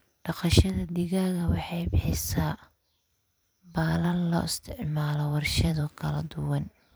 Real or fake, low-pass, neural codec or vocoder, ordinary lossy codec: fake; none; vocoder, 44.1 kHz, 128 mel bands every 512 samples, BigVGAN v2; none